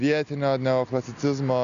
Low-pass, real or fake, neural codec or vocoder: 7.2 kHz; real; none